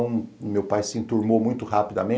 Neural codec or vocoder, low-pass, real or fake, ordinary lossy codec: none; none; real; none